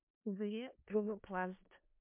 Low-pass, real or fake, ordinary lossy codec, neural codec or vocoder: 3.6 kHz; fake; MP3, 32 kbps; codec, 16 kHz in and 24 kHz out, 0.4 kbps, LongCat-Audio-Codec, four codebook decoder